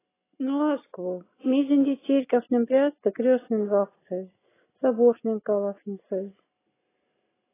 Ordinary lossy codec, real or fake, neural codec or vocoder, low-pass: AAC, 16 kbps; real; none; 3.6 kHz